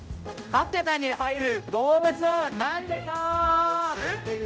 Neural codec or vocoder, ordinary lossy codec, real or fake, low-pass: codec, 16 kHz, 0.5 kbps, X-Codec, HuBERT features, trained on general audio; none; fake; none